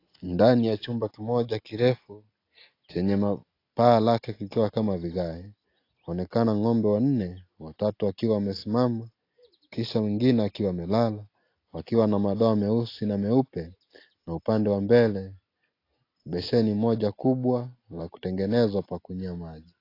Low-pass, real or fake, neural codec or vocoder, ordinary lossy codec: 5.4 kHz; real; none; AAC, 32 kbps